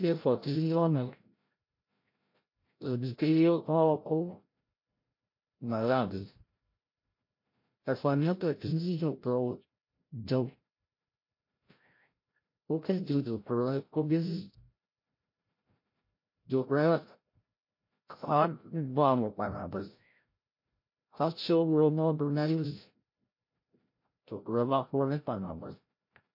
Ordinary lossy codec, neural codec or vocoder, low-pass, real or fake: MP3, 32 kbps; codec, 16 kHz, 0.5 kbps, FreqCodec, larger model; 5.4 kHz; fake